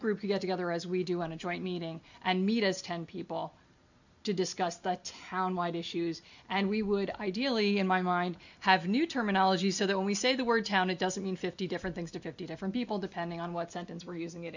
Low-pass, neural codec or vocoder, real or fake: 7.2 kHz; none; real